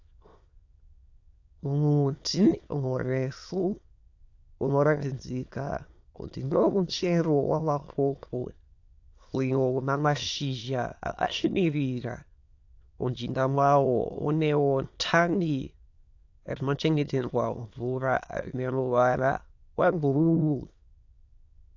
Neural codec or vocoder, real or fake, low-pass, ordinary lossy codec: autoencoder, 22.05 kHz, a latent of 192 numbers a frame, VITS, trained on many speakers; fake; 7.2 kHz; AAC, 48 kbps